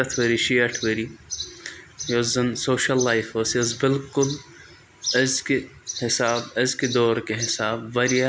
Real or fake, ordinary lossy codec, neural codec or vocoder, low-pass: real; none; none; none